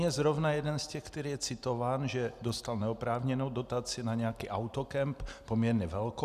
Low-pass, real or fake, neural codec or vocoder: 14.4 kHz; real; none